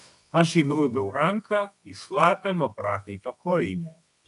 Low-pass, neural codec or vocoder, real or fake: 10.8 kHz; codec, 24 kHz, 0.9 kbps, WavTokenizer, medium music audio release; fake